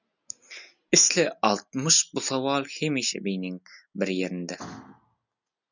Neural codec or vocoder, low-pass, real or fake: none; 7.2 kHz; real